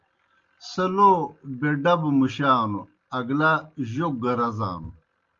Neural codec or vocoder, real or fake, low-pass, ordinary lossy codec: none; real; 7.2 kHz; Opus, 32 kbps